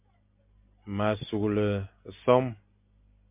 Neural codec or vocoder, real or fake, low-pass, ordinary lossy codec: none; real; 3.6 kHz; MP3, 32 kbps